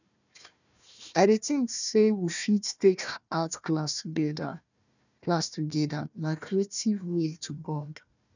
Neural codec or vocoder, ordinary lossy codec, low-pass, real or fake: codec, 16 kHz, 1 kbps, FunCodec, trained on Chinese and English, 50 frames a second; none; 7.2 kHz; fake